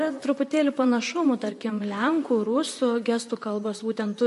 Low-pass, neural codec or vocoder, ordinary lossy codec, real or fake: 14.4 kHz; vocoder, 44.1 kHz, 128 mel bands, Pupu-Vocoder; MP3, 48 kbps; fake